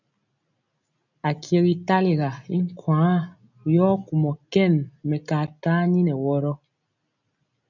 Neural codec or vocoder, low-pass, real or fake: none; 7.2 kHz; real